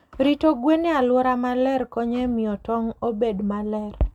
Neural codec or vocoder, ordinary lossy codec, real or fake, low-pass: none; none; real; 19.8 kHz